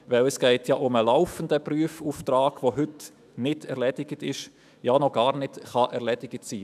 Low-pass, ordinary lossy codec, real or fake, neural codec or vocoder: 14.4 kHz; none; fake; autoencoder, 48 kHz, 128 numbers a frame, DAC-VAE, trained on Japanese speech